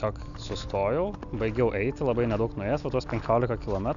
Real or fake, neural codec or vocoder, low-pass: real; none; 7.2 kHz